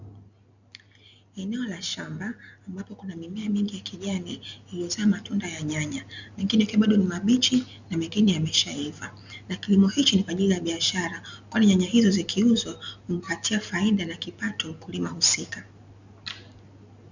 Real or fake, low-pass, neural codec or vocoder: real; 7.2 kHz; none